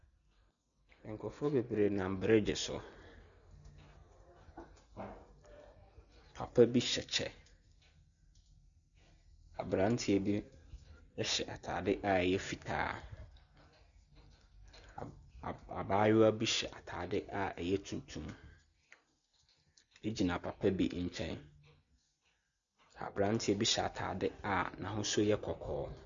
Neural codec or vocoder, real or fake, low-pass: none; real; 7.2 kHz